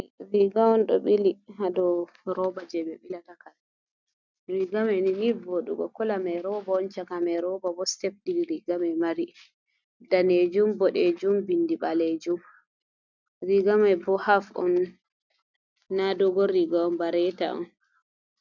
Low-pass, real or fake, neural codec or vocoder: 7.2 kHz; real; none